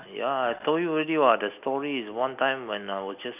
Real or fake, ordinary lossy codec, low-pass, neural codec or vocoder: real; none; 3.6 kHz; none